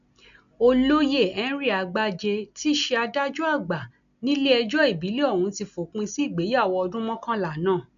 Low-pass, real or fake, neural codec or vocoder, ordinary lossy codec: 7.2 kHz; real; none; none